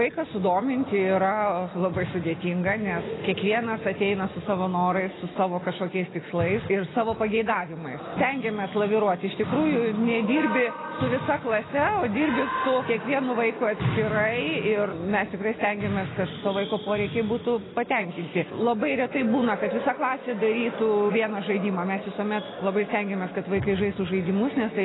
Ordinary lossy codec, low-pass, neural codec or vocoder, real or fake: AAC, 16 kbps; 7.2 kHz; none; real